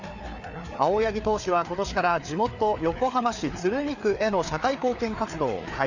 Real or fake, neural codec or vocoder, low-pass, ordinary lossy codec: fake; codec, 16 kHz, 4 kbps, FreqCodec, larger model; 7.2 kHz; none